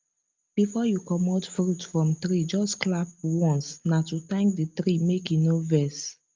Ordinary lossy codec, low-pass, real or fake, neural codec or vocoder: Opus, 24 kbps; 7.2 kHz; real; none